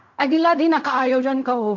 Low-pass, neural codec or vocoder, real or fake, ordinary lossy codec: 7.2 kHz; codec, 16 kHz in and 24 kHz out, 0.4 kbps, LongCat-Audio-Codec, fine tuned four codebook decoder; fake; MP3, 48 kbps